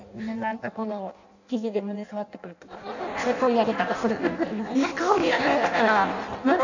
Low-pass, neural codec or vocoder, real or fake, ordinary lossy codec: 7.2 kHz; codec, 16 kHz in and 24 kHz out, 0.6 kbps, FireRedTTS-2 codec; fake; none